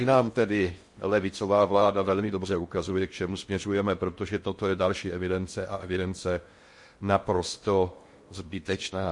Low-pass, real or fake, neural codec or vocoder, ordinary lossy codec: 10.8 kHz; fake; codec, 16 kHz in and 24 kHz out, 0.6 kbps, FocalCodec, streaming, 4096 codes; MP3, 48 kbps